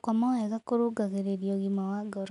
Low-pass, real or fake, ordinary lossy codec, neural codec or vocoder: 10.8 kHz; real; none; none